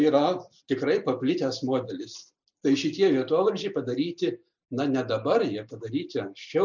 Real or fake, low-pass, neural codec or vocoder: real; 7.2 kHz; none